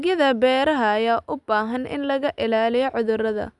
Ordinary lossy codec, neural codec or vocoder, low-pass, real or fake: none; none; 10.8 kHz; real